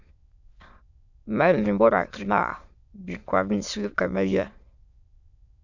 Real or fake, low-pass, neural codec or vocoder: fake; 7.2 kHz; autoencoder, 22.05 kHz, a latent of 192 numbers a frame, VITS, trained on many speakers